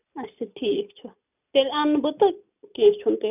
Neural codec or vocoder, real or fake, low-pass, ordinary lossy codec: none; real; 3.6 kHz; none